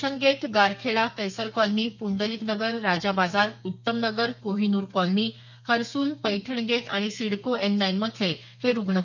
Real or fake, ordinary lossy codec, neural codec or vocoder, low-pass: fake; none; codec, 32 kHz, 1.9 kbps, SNAC; 7.2 kHz